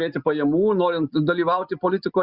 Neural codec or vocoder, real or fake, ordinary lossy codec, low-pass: none; real; Opus, 64 kbps; 5.4 kHz